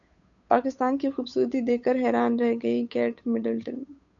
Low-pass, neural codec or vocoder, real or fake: 7.2 kHz; codec, 16 kHz, 8 kbps, FunCodec, trained on Chinese and English, 25 frames a second; fake